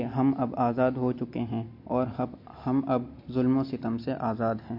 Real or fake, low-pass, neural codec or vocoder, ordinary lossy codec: real; 5.4 kHz; none; MP3, 32 kbps